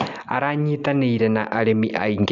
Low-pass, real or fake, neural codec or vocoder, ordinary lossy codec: 7.2 kHz; real; none; none